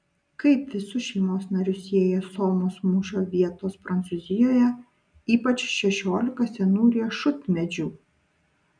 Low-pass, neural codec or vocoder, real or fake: 9.9 kHz; none; real